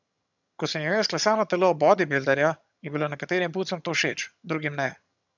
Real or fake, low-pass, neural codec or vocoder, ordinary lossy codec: fake; 7.2 kHz; vocoder, 22.05 kHz, 80 mel bands, HiFi-GAN; none